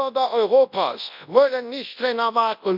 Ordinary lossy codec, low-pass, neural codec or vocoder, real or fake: MP3, 48 kbps; 5.4 kHz; codec, 24 kHz, 0.9 kbps, WavTokenizer, large speech release; fake